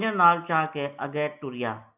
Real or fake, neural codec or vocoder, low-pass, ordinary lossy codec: real; none; 3.6 kHz; AAC, 32 kbps